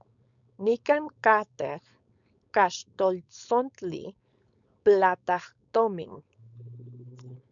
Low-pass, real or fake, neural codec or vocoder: 7.2 kHz; fake; codec, 16 kHz, 4.8 kbps, FACodec